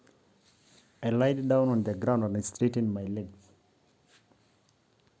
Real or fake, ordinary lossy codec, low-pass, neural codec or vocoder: real; none; none; none